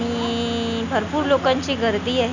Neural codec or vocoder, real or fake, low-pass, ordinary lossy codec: none; real; 7.2 kHz; none